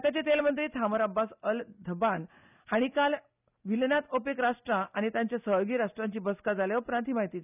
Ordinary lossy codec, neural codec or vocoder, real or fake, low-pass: none; none; real; 3.6 kHz